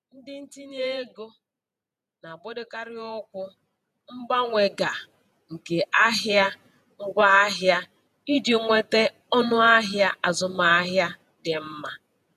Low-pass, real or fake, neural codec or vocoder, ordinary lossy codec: 14.4 kHz; fake; vocoder, 48 kHz, 128 mel bands, Vocos; none